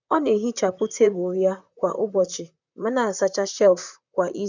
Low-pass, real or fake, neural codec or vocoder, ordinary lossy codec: 7.2 kHz; fake; vocoder, 44.1 kHz, 128 mel bands, Pupu-Vocoder; none